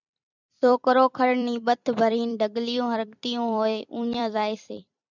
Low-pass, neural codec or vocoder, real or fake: 7.2 kHz; vocoder, 44.1 kHz, 128 mel bands every 256 samples, BigVGAN v2; fake